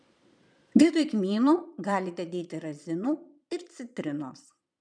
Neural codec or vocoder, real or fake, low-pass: vocoder, 22.05 kHz, 80 mel bands, Vocos; fake; 9.9 kHz